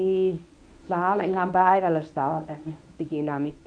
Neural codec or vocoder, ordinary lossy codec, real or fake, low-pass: codec, 24 kHz, 0.9 kbps, WavTokenizer, medium speech release version 1; none; fake; 9.9 kHz